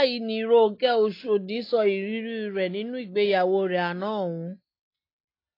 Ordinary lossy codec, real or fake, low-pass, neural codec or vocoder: AAC, 32 kbps; real; 5.4 kHz; none